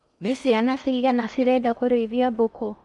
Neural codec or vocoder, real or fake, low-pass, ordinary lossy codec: codec, 16 kHz in and 24 kHz out, 0.6 kbps, FocalCodec, streaming, 4096 codes; fake; 10.8 kHz; none